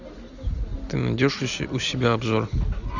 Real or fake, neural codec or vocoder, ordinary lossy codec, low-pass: real; none; Opus, 64 kbps; 7.2 kHz